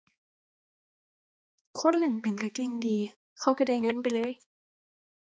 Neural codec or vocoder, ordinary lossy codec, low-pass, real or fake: codec, 16 kHz, 2 kbps, X-Codec, HuBERT features, trained on balanced general audio; none; none; fake